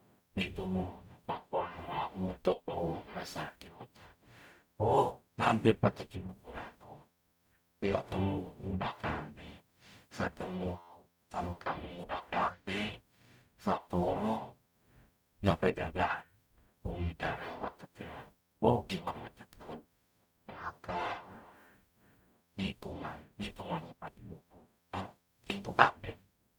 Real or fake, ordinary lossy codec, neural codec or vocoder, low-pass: fake; none; codec, 44.1 kHz, 0.9 kbps, DAC; 19.8 kHz